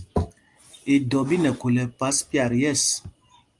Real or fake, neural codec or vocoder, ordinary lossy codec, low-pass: real; none; Opus, 32 kbps; 10.8 kHz